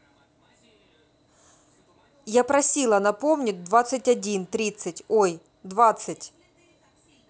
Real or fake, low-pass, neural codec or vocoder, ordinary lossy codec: real; none; none; none